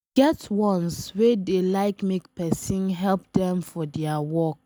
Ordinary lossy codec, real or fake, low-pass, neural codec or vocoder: none; real; none; none